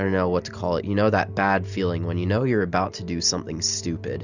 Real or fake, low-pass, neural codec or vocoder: real; 7.2 kHz; none